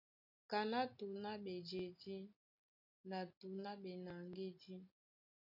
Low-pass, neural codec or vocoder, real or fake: 5.4 kHz; none; real